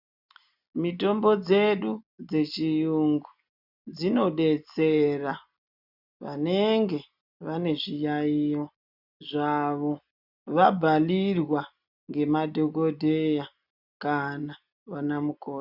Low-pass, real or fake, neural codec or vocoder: 5.4 kHz; real; none